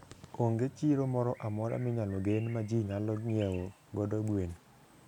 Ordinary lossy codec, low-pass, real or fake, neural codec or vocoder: none; 19.8 kHz; real; none